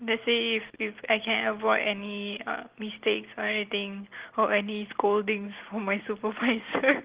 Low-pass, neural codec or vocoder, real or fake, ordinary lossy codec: 3.6 kHz; none; real; Opus, 16 kbps